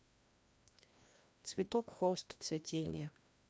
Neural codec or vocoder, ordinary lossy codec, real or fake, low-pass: codec, 16 kHz, 1 kbps, FreqCodec, larger model; none; fake; none